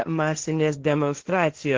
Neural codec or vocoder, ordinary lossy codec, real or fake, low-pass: codec, 16 kHz, 1.1 kbps, Voila-Tokenizer; Opus, 16 kbps; fake; 7.2 kHz